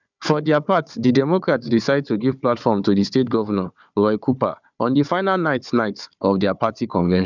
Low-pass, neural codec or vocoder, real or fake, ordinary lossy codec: 7.2 kHz; codec, 16 kHz, 4 kbps, FunCodec, trained on Chinese and English, 50 frames a second; fake; none